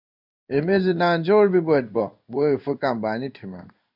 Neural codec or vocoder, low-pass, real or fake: codec, 16 kHz in and 24 kHz out, 1 kbps, XY-Tokenizer; 5.4 kHz; fake